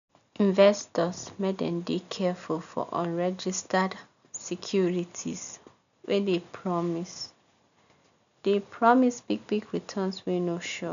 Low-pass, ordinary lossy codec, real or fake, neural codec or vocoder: 7.2 kHz; none; real; none